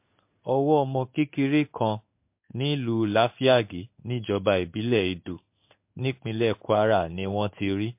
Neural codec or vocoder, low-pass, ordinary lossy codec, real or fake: codec, 16 kHz in and 24 kHz out, 1 kbps, XY-Tokenizer; 3.6 kHz; MP3, 32 kbps; fake